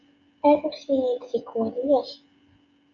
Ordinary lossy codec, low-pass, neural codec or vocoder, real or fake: MP3, 48 kbps; 7.2 kHz; codec, 16 kHz, 16 kbps, FreqCodec, smaller model; fake